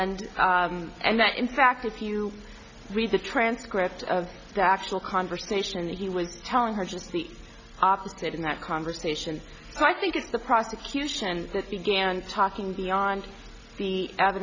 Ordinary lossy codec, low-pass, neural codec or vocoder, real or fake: MP3, 64 kbps; 7.2 kHz; none; real